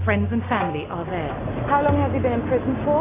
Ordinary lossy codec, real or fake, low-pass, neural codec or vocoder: AAC, 16 kbps; real; 3.6 kHz; none